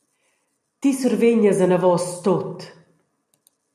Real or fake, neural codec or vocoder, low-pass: real; none; 14.4 kHz